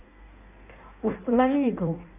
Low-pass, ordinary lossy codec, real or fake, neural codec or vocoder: 3.6 kHz; none; fake; codec, 16 kHz in and 24 kHz out, 1.1 kbps, FireRedTTS-2 codec